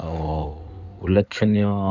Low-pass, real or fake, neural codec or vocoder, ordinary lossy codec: 7.2 kHz; fake; codec, 16 kHz in and 24 kHz out, 2.2 kbps, FireRedTTS-2 codec; none